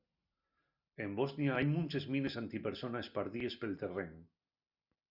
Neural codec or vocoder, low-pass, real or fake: none; 5.4 kHz; real